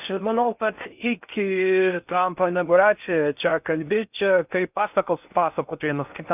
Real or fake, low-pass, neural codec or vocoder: fake; 3.6 kHz; codec, 16 kHz in and 24 kHz out, 0.6 kbps, FocalCodec, streaming, 4096 codes